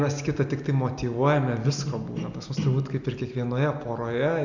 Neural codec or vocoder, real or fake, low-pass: none; real; 7.2 kHz